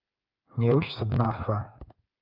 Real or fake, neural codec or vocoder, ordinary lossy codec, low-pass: fake; codec, 16 kHz, 8 kbps, FreqCodec, smaller model; Opus, 32 kbps; 5.4 kHz